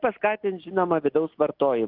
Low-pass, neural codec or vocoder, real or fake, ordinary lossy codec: 5.4 kHz; none; real; Opus, 32 kbps